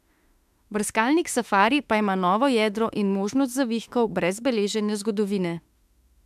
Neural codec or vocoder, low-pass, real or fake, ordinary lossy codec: autoencoder, 48 kHz, 32 numbers a frame, DAC-VAE, trained on Japanese speech; 14.4 kHz; fake; MP3, 96 kbps